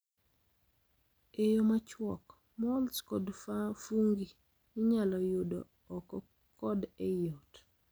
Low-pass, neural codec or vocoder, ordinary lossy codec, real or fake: none; none; none; real